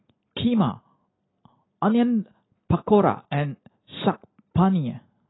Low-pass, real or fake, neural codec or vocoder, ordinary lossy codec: 7.2 kHz; real; none; AAC, 16 kbps